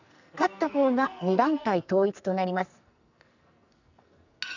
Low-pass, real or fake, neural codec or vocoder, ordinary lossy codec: 7.2 kHz; fake; codec, 44.1 kHz, 2.6 kbps, SNAC; none